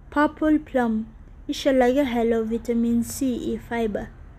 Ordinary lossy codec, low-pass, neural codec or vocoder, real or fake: none; 14.4 kHz; none; real